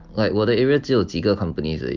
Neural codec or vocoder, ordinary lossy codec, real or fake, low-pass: none; Opus, 32 kbps; real; 7.2 kHz